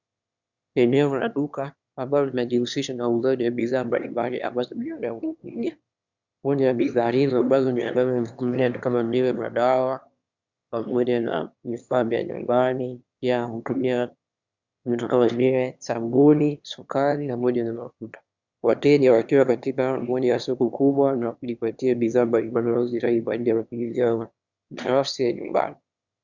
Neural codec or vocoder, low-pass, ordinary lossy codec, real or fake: autoencoder, 22.05 kHz, a latent of 192 numbers a frame, VITS, trained on one speaker; 7.2 kHz; Opus, 64 kbps; fake